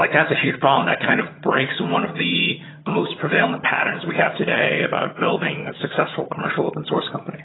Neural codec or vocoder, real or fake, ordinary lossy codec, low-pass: vocoder, 22.05 kHz, 80 mel bands, HiFi-GAN; fake; AAC, 16 kbps; 7.2 kHz